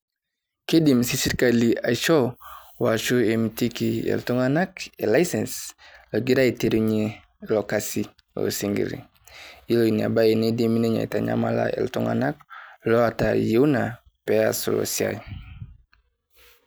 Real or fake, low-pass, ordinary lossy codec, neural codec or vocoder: real; none; none; none